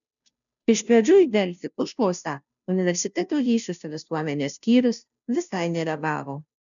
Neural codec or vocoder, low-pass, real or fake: codec, 16 kHz, 0.5 kbps, FunCodec, trained on Chinese and English, 25 frames a second; 7.2 kHz; fake